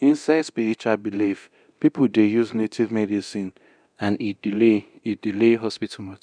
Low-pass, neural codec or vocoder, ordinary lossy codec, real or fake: 9.9 kHz; codec, 24 kHz, 0.9 kbps, DualCodec; none; fake